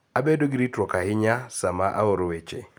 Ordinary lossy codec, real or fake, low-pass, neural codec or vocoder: none; real; none; none